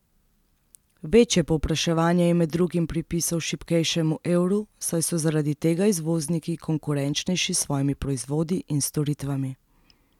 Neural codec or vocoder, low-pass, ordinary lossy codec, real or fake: none; 19.8 kHz; none; real